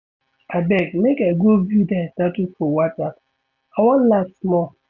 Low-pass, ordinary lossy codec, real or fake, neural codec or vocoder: 7.2 kHz; none; real; none